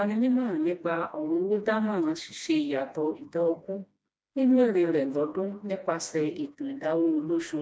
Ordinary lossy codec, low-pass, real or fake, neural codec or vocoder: none; none; fake; codec, 16 kHz, 1 kbps, FreqCodec, smaller model